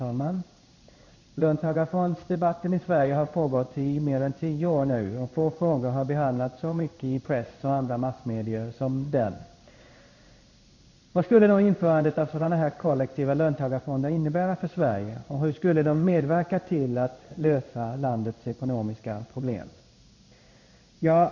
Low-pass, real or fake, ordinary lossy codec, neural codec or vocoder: 7.2 kHz; fake; MP3, 64 kbps; codec, 16 kHz in and 24 kHz out, 1 kbps, XY-Tokenizer